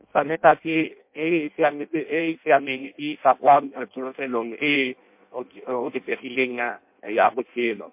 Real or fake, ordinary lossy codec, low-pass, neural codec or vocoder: fake; MP3, 32 kbps; 3.6 kHz; codec, 16 kHz in and 24 kHz out, 0.6 kbps, FireRedTTS-2 codec